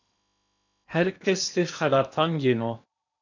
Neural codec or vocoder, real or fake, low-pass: codec, 16 kHz in and 24 kHz out, 0.8 kbps, FocalCodec, streaming, 65536 codes; fake; 7.2 kHz